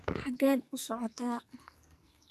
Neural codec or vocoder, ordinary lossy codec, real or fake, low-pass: codec, 44.1 kHz, 2.6 kbps, SNAC; none; fake; 14.4 kHz